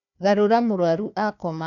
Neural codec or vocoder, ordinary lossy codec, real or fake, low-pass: codec, 16 kHz, 1 kbps, FunCodec, trained on Chinese and English, 50 frames a second; none; fake; 7.2 kHz